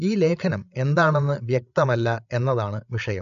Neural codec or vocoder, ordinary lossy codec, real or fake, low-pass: codec, 16 kHz, 8 kbps, FreqCodec, larger model; none; fake; 7.2 kHz